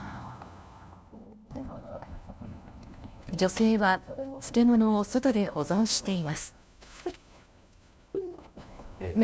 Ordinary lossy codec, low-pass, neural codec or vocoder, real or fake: none; none; codec, 16 kHz, 1 kbps, FunCodec, trained on LibriTTS, 50 frames a second; fake